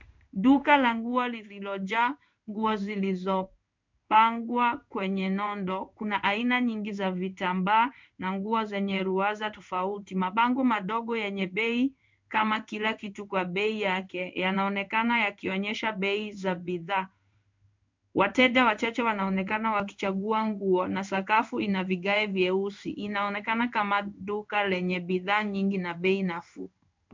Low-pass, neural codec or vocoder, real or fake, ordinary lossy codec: 7.2 kHz; codec, 16 kHz in and 24 kHz out, 1 kbps, XY-Tokenizer; fake; MP3, 64 kbps